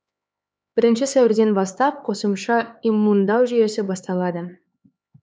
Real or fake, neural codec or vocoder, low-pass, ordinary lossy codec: fake; codec, 16 kHz, 4 kbps, X-Codec, HuBERT features, trained on LibriSpeech; none; none